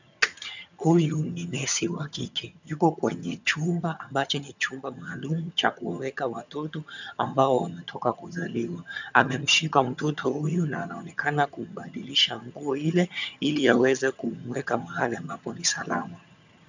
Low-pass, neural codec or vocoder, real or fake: 7.2 kHz; vocoder, 22.05 kHz, 80 mel bands, HiFi-GAN; fake